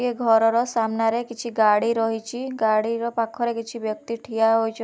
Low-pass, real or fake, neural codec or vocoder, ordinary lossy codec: none; real; none; none